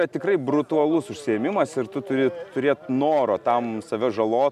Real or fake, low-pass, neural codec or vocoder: real; 14.4 kHz; none